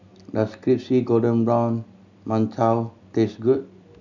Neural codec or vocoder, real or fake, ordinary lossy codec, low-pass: none; real; none; 7.2 kHz